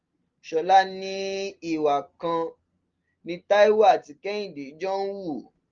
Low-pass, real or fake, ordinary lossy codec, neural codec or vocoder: 7.2 kHz; real; Opus, 32 kbps; none